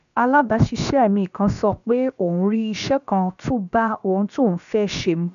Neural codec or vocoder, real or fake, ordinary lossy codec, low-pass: codec, 16 kHz, about 1 kbps, DyCAST, with the encoder's durations; fake; none; 7.2 kHz